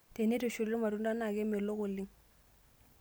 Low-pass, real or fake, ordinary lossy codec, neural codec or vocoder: none; real; none; none